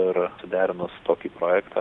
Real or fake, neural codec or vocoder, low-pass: real; none; 10.8 kHz